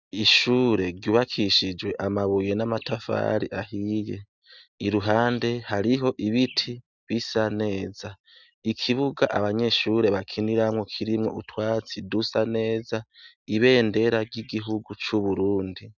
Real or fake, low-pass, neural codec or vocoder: real; 7.2 kHz; none